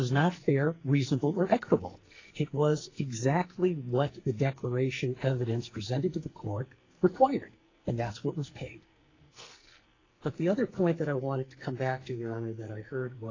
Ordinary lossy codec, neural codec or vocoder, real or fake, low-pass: AAC, 32 kbps; codec, 44.1 kHz, 2.6 kbps, SNAC; fake; 7.2 kHz